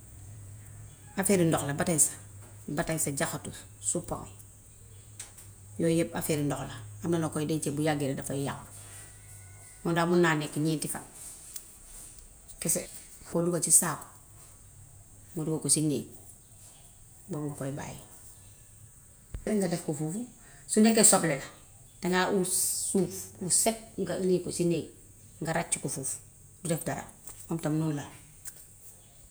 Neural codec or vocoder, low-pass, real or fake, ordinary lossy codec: vocoder, 48 kHz, 128 mel bands, Vocos; none; fake; none